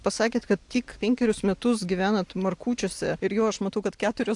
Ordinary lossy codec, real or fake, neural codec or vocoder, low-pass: AAC, 64 kbps; real; none; 10.8 kHz